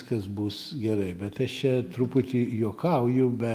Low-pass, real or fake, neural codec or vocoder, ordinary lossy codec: 14.4 kHz; fake; autoencoder, 48 kHz, 128 numbers a frame, DAC-VAE, trained on Japanese speech; Opus, 32 kbps